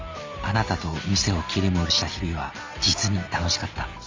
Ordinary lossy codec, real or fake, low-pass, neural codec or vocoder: Opus, 32 kbps; real; 7.2 kHz; none